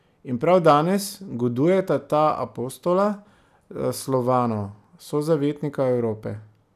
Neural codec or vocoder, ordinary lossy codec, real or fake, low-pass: none; none; real; 14.4 kHz